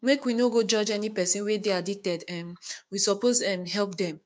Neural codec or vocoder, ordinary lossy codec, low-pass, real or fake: codec, 16 kHz, 6 kbps, DAC; none; none; fake